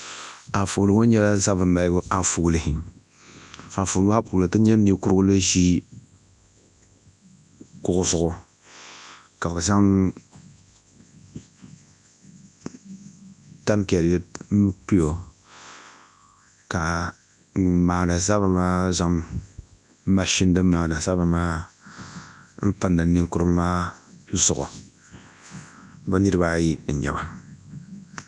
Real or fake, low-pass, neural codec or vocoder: fake; 10.8 kHz; codec, 24 kHz, 0.9 kbps, WavTokenizer, large speech release